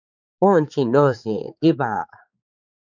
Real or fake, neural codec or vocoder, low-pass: fake; codec, 16 kHz, 4 kbps, X-Codec, HuBERT features, trained on balanced general audio; 7.2 kHz